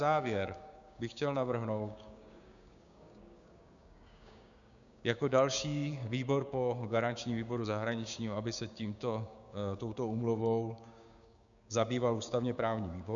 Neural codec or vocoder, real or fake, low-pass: codec, 16 kHz, 6 kbps, DAC; fake; 7.2 kHz